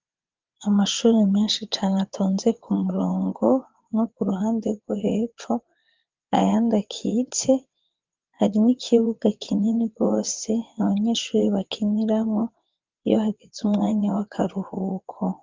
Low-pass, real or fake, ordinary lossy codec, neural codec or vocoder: 7.2 kHz; fake; Opus, 24 kbps; vocoder, 22.05 kHz, 80 mel bands, WaveNeXt